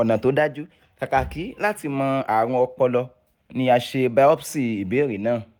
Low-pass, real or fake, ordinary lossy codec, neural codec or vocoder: none; real; none; none